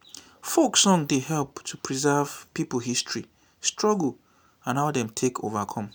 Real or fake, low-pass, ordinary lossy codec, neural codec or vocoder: real; none; none; none